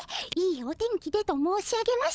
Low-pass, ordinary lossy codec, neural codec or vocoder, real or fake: none; none; codec, 16 kHz, 16 kbps, FunCodec, trained on LibriTTS, 50 frames a second; fake